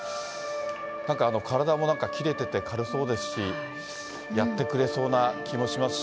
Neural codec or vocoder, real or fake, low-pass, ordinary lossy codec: none; real; none; none